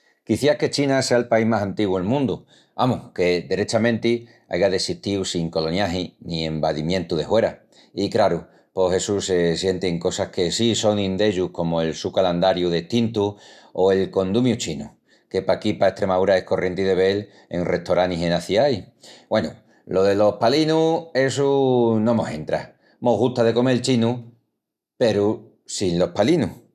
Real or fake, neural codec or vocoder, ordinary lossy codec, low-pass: real; none; none; 14.4 kHz